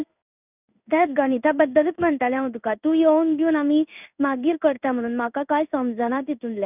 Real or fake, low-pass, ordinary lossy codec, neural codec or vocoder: fake; 3.6 kHz; none; codec, 16 kHz in and 24 kHz out, 1 kbps, XY-Tokenizer